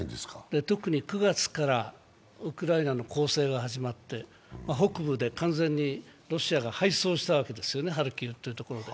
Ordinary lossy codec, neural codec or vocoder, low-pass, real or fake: none; none; none; real